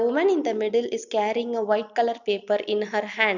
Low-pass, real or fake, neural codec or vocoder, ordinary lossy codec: 7.2 kHz; real; none; none